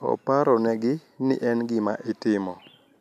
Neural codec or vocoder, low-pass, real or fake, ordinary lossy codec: none; 14.4 kHz; real; none